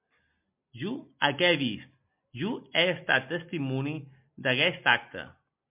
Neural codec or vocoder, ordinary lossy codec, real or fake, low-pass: none; MP3, 32 kbps; real; 3.6 kHz